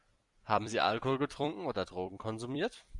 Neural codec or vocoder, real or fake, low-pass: vocoder, 44.1 kHz, 128 mel bands every 256 samples, BigVGAN v2; fake; 10.8 kHz